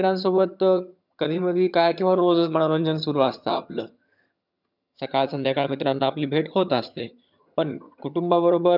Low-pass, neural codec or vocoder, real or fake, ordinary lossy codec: 5.4 kHz; vocoder, 22.05 kHz, 80 mel bands, HiFi-GAN; fake; none